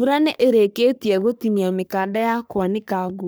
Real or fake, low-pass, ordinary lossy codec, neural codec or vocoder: fake; none; none; codec, 44.1 kHz, 3.4 kbps, Pupu-Codec